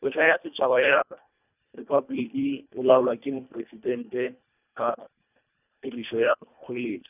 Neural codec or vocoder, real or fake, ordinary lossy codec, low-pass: codec, 24 kHz, 1.5 kbps, HILCodec; fake; none; 3.6 kHz